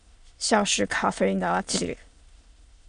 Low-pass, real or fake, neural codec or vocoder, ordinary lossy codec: 9.9 kHz; fake; autoencoder, 22.05 kHz, a latent of 192 numbers a frame, VITS, trained on many speakers; Opus, 64 kbps